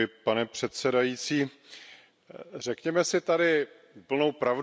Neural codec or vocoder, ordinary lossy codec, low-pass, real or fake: none; none; none; real